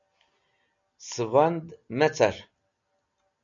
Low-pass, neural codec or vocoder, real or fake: 7.2 kHz; none; real